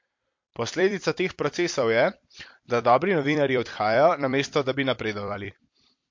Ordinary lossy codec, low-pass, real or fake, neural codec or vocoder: MP3, 48 kbps; 7.2 kHz; fake; vocoder, 44.1 kHz, 128 mel bands every 256 samples, BigVGAN v2